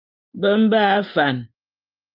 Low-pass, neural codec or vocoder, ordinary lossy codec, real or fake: 5.4 kHz; none; Opus, 24 kbps; real